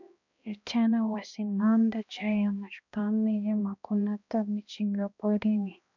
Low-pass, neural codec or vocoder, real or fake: 7.2 kHz; codec, 16 kHz, 1 kbps, X-Codec, HuBERT features, trained on balanced general audio; fake